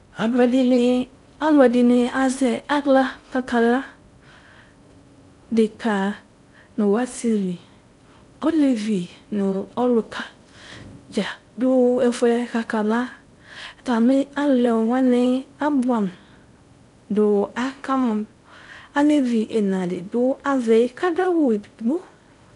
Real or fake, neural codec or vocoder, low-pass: fake; codec, 16 kHz in and 24 kHz out, 0.6 kbps, FocalCodec, streaming, 4096 codes; 10.8 kHz